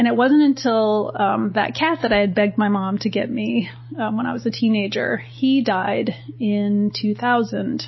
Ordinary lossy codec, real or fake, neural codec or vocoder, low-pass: MP3, 24 kbps; real; none; 7.2 kHz